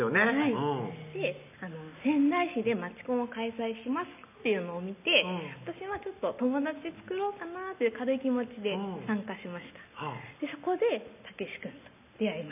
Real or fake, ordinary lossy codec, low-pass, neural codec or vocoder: real; none; 3.6 kHz; none